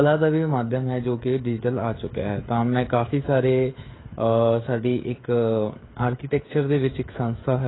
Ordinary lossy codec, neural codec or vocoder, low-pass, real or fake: AAC, 16 kbps; codec, 16 kHz, 16 kbps, FreqCodec, smaller model; 7.2 kHz; fake